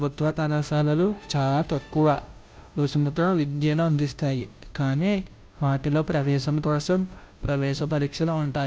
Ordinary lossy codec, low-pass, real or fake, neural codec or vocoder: none; none; fake; codec, 16 kHz, 0.5 kbps, FunCodec, trained on Chinese and English, 25 frames a second